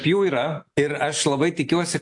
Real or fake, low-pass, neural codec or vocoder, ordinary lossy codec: real; 10.8 kHz; none; AAC, 64 kbps